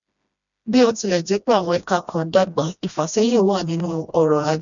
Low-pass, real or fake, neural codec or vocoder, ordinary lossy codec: 7.2 kHz; fake; codec, 16 kHz, 1 kbps, FreqCodec, smaller model; none